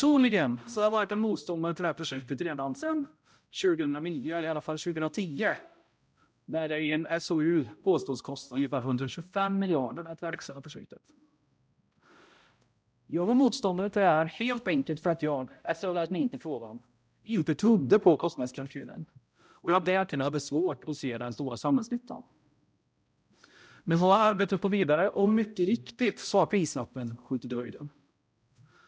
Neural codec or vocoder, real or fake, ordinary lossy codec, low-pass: codec, 16 kHz, 0.5 kbps, X-Codec, HuBERT features, trained on balanced general audio; fake; none; none